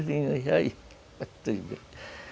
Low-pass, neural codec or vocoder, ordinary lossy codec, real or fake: none; none; none; real